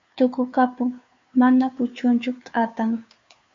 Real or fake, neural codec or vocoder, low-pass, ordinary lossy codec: fake; codec, 16 kHz, 2 kbps, FunCodec, trained on Chinese and English, 25 frames a second; 7.2 kHz; MP3, 48 kbps